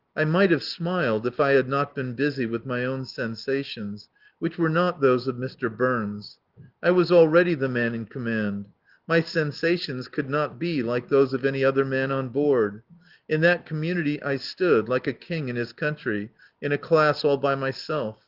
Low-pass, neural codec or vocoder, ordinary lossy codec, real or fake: 5.4 kHz; none; Opus, 16 kbps; real